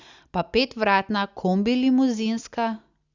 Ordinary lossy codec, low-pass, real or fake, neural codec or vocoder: Opus, 64 kbps; 7.2 kHz; real; none